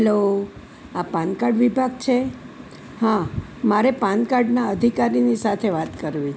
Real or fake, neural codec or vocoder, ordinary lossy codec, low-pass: real; none; none; none